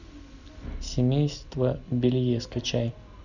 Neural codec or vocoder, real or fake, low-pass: none; real; 7.2 kHz